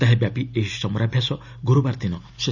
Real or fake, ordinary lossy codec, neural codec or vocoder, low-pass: real; none; none; 7.2 kHz